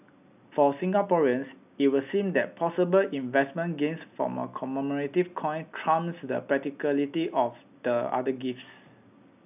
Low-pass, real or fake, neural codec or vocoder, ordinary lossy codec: 3.6 kHz; real; none; none